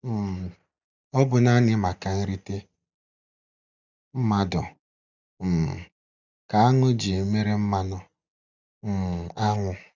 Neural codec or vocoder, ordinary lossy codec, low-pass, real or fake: none; none; 7.2 kHz; real